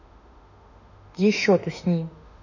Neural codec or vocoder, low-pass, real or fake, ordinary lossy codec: autoencoder, 48 kHz, 32 numbers a frame, DAC-VAE, trained on Japanese speech; 7.2 kHz; fake; none